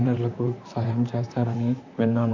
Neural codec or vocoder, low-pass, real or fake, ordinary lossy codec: vocoder, 44.1 kHz, 128 mel bands, Pupu-Vocoder; 7.2 kHz; fake; none